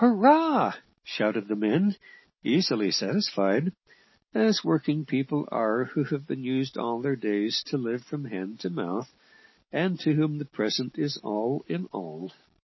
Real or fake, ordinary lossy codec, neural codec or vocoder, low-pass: real; MP3, 24 kbps; none; 7.2 kHz